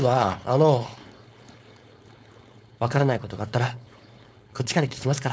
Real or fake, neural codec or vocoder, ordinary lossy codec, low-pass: fake; codec, 16 kHz, 4.8 kbps, FACodec; none; none